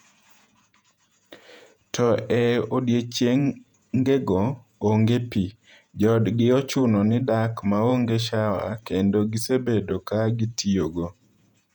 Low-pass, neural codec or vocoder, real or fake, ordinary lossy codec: 19.8 kHz; vocoder, 48 kHz, 128 mel bands, Vocos; fake; none